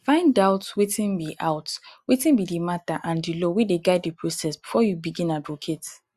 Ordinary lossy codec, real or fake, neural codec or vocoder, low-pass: Opus, 64 kbps; real; none; 14.4 kHz